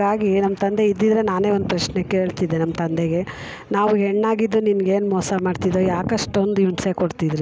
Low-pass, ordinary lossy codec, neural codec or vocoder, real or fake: none; none; none; real